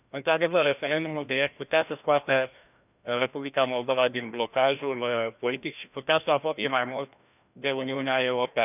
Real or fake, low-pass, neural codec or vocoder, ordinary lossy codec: fake; 3.6 kHz; codec, 16 kHz, 1 kbps, FreqCodec, larger model; none